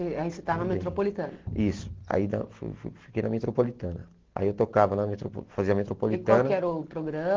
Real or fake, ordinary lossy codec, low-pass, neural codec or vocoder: real; Opus, 16 kbps; 7.2 kHz; none